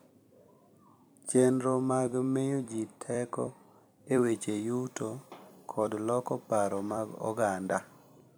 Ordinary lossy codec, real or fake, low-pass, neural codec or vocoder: none; fake; none; vocoder, 44.1 kHz, 128 mel bands every 256 samples, BigVGAN v2